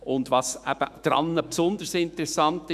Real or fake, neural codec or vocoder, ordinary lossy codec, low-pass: fake; autoencoder, 48 kHz, 128 numbers a frame, DAC-VAE, trained on Japanese speech; none; 14.4 kHz